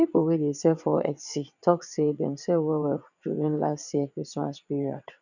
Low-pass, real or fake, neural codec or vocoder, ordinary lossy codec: 7.2 kHz; fake; vocoder, 22.05 kHz, 80 mel bands, WaveNeXt; none